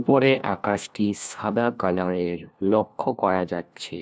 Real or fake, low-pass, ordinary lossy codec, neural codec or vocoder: fake; none; none; codec, 16 kHz, 1 kbps, FunCodec, trained on LibriTTS, 50 frames a second